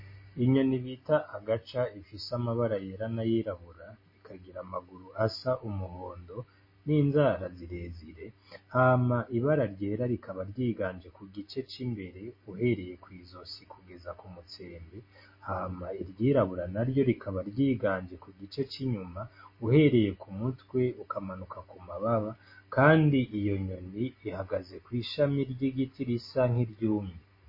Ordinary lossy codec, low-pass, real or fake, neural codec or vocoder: MP3, 24 kbps; 5.4 kHz; real; none